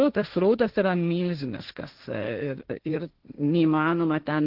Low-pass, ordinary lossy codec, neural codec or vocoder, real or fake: 5.4 kHz; Opus, 16 kbps; codec, 16 kHz, 1.1 kbps, Voila-Tokenizer; fake